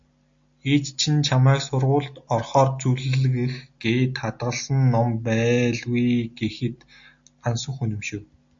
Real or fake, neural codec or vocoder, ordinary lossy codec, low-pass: real; none; AAC, 64 kbps; 7.2 kHz